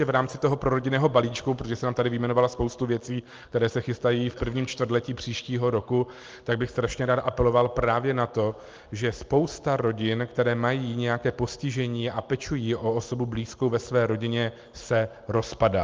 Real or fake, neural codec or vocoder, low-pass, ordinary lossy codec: real; none; 7.2 kHz; Opus, 24 kbps